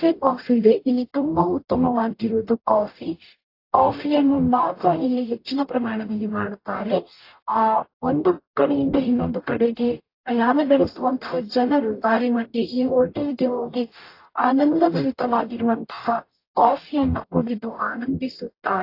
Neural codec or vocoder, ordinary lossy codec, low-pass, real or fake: codec, 44.1 kHz, 0.9 kbps, DAC; AAC, 32 kbps; 5.4 kHz; fake